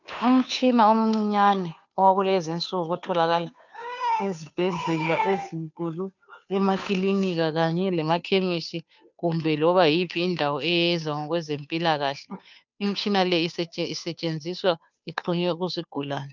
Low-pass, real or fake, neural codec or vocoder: 7.2 kHz; fake; codec, 16 kHz, 2 kbps, FunCodec, trained on Chinese and English, 25 frames a second